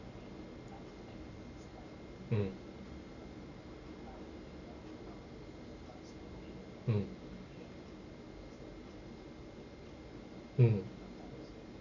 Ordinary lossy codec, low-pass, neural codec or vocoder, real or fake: none; 7.2 kHz; none; real